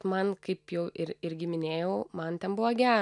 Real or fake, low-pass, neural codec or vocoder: real; 10.8 kHz; none